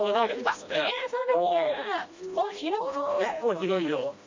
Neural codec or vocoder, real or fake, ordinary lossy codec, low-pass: codec, 16 kHz, 1 kbps, FreqCodec, smaller model; fake; MP3, 48 kbps; 7.2 kHz